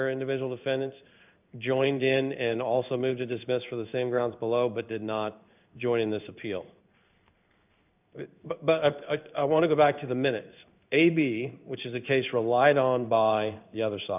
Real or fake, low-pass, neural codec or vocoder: fake; 3.6 kHz; codec, 16 kHz in and 24 kHz out, 1 kbps, XY-Tokenizer